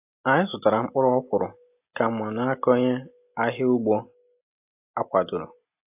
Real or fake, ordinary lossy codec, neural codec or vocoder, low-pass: real; AAC, 32 kbps; none; 3.6 kHz